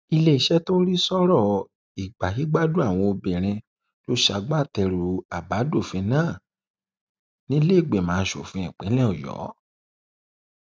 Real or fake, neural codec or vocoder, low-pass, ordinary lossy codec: real; none; none; none